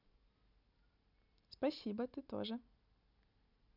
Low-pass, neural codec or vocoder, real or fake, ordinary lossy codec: 5.4 kHz; none; real; none